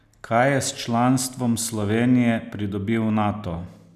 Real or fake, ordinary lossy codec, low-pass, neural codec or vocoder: real; none; 14.4 kHz; none